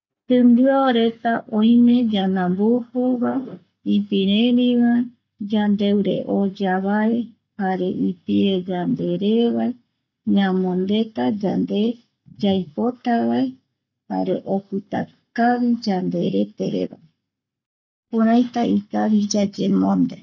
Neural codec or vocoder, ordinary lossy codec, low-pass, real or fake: codec, 44.1 kHz, 7.8 kbps, Pupu-Codec; none; 7.2 kHz; fake